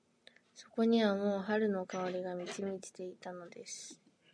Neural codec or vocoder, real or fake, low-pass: none; real; 9.9 kHz